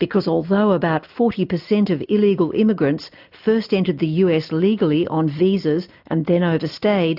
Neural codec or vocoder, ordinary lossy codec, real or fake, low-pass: none; AAC, 48 kbps; real; 5.4 kHz